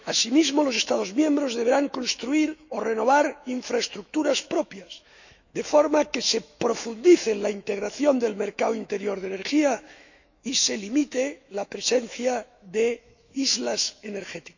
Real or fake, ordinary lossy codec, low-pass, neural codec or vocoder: fake; none; 7.2 kHz; autoencoder, 48 kHz, 128 numbers a frame, DAC-VAE, trained on Japanese speech